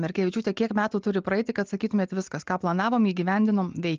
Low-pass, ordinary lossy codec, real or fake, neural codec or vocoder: 7.2 kHz; Opus, 24 kbps; real; none